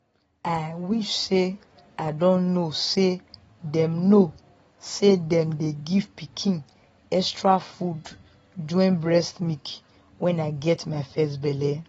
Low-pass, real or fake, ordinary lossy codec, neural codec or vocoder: 19.8 kHz; real; AAC, 24 kbps; none